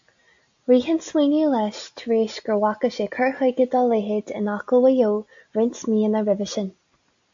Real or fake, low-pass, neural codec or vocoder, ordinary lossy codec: real; 7.2 kHz; none; AAC, 64 kbps